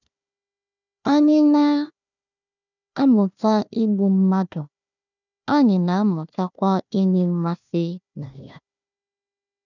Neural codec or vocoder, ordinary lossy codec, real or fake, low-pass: codec, 16 kHz, 1 kbps, FunCodec, trained on Chinese and English, 50 frames a second; none; fake; 7.2 kHz